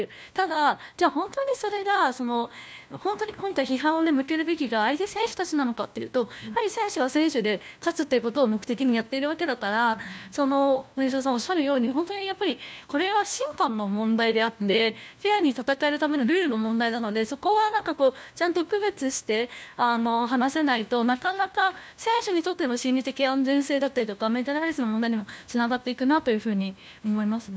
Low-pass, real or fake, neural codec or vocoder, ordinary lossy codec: none; fake; codec, 16 kHz, 1 kbps, FunCodec, trained on LibriTTS, 50 frames a second; none